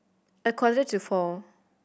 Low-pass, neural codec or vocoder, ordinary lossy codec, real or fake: none; none; none; real